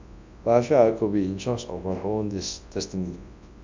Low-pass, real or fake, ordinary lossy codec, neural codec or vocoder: 7.2 kHz; fake; MP3, 64 kbps; codec, 24 kHz, 0.9 kbps, WavTokenizer, large speech release